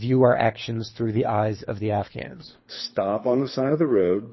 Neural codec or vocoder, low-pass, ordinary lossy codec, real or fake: codec, 24 kHz, 3 kbps, HILCodec; 7.2 kHz; MP3, 24 kbps; fake